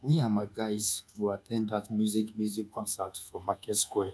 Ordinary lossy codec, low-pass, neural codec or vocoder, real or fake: none; none; codec, 24 kHz, 1.2 kbps, DualCodec; fake